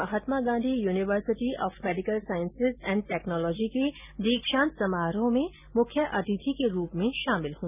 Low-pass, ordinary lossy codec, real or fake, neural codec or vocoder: 3.6 kHz; none; real; none